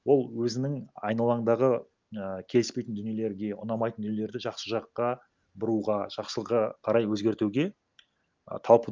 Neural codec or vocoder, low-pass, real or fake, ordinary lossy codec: none; 7.2 kHz; real; Opus, 24 kbps